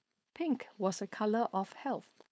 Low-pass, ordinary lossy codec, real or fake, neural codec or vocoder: none; none; fake; codec, 16 kHz, 4.8 kbps, FACodec